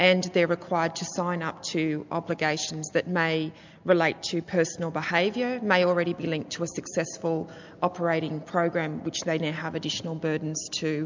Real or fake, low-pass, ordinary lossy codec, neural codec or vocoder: real; 7.2 kHz; MP3, 64 kbps; none